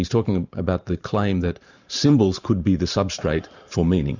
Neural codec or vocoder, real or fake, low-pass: vocoder, 44.1 kHz, 128 mel bands every 512 samples, BigVGAN v2; fake; 7.2 kHz